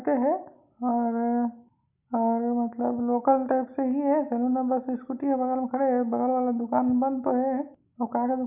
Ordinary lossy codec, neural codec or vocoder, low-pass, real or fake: none; none; 3.6 kHz; real